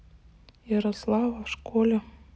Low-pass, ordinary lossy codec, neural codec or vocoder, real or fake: none; none; none; real